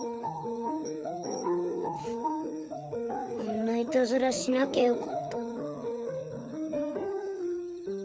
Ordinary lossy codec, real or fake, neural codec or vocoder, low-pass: none; fake; codec, 16 kHz, 4 kbps, FreqCodec, larger model; none